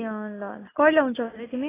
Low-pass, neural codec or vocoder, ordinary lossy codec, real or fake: 3.6 kHz; none; AAC, 16 kbps; real